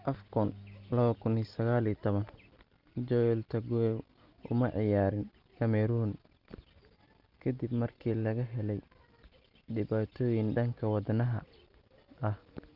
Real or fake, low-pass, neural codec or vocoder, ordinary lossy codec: real; 5.4 kHz; none; Opus, 32 kbps